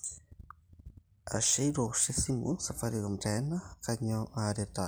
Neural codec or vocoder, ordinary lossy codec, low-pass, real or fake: none; none; none; real